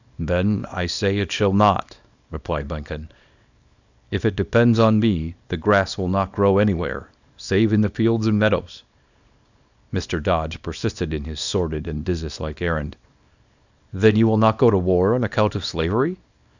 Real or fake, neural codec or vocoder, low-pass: fake; codec, 24 kHz, 0.9 kbps, WavTokenizer, small release; 7.2 kHz